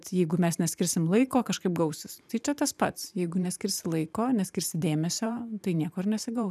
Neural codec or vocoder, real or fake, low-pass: vocoder, 44.1 kHz, 128 mel bands every 512 samples, BigVGAN v2; fake; 14.4 kHz